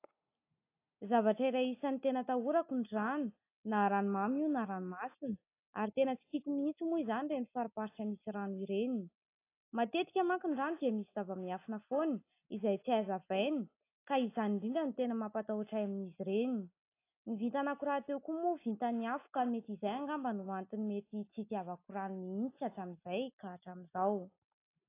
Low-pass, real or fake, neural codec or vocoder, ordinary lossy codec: 3.6 kHz; real; none; AAC, 24 kbps